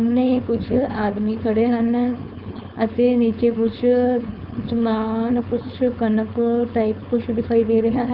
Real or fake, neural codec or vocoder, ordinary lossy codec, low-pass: fake; codec, 16 kHz, 4.8 kbps, FACodec; none; 5.4 kHz